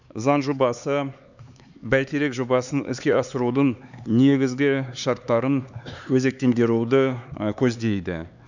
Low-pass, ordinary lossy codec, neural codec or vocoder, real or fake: 7.2 kHz; none; codec, 16 kHz, 4 kbps, X-Codec, HuBERT features, trained on LibriSpeech; fake